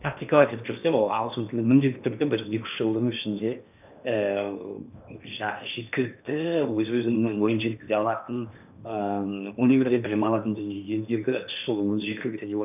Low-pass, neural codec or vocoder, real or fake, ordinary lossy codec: 3.6 kHz; codec, 16 kHz in and 24 kHz out, 0.8 kbps, FocalCodec, streaming, 65536 codes; fake; none